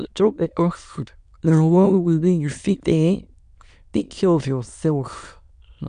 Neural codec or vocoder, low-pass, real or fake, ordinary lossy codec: autoencoder, 22.05 kHz, a latent of 192 numbers a frame, VITS, trained on many speakers; 9.9 kHz; fake; none